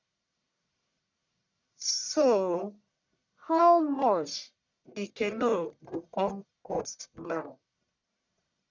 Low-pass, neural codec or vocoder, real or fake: 7.2 kHz; codec, 44.1 kHz, 1.7 kbps, Pupu-Codec; fake